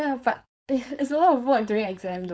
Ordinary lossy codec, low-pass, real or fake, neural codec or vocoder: none; none; fake; codec, 16 kHz, 4.8 kbps, FACodec